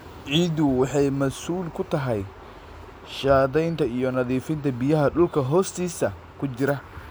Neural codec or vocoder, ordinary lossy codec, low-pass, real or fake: none; none; none; real